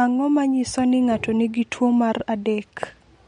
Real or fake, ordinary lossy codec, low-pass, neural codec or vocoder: real; MP3, 48 kbps; 9.9 kHz; none